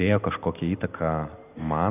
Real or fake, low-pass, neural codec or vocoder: real; 3.6 kHz; none